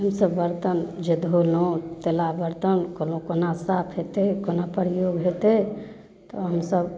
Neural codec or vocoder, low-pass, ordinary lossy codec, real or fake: none; none; none; real